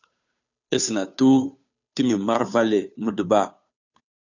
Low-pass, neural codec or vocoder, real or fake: 7.2 kHz; codec, 16 kHz, 2 kbps, FunCodec, trained on Chinese and English, 25 frames a second; fake